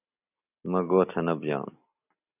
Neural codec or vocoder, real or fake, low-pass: none; real; 3.6 kHz